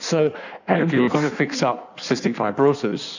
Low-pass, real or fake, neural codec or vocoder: 7.2 kHz; fake; codec, 16 kHz in and 24 kHz out, 1.1 kbps, FireRedTTS-2 codec